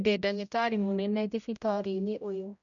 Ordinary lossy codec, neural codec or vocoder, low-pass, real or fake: none; codec, 16 kHz, 0.5 kbps, X-Codec, HuBERT features, trained on general audio; 7.2 kHz; fake